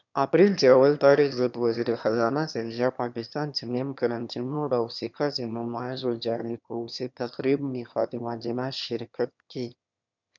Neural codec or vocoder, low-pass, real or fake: autoencoder, 22.05 kHz, a latent of 192 numbers a frame, VITS, trained on one speaker; 7.2 kHz; fake